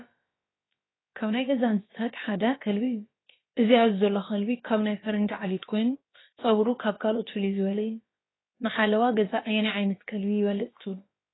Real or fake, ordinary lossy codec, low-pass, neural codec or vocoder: fake; AAC, 16 kbps; 7.2 kHz; codec, 16 kHz, about 1 kbps, DyCAST, with the encoder's durations